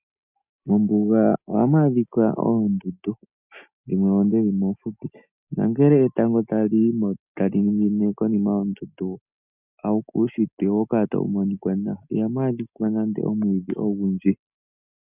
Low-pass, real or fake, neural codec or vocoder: 3.6 kHz; real; none